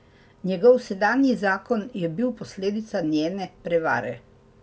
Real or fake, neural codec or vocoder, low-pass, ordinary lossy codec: real; none; none; none